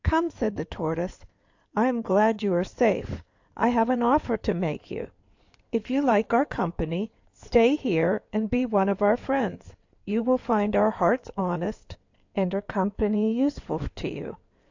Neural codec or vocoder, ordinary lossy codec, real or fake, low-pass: codec, 16 kHz in and 24 kHz out, 2.2 kbps, FireRedTTS-2 codec; AAC, 48 kbps; fake; 7.2 kHz